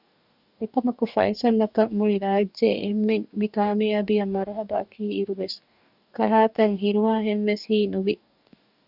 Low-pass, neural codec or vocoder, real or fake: 5.4 kHz; codec, 44.1 kHz, 2.6 kbps, DAC; fake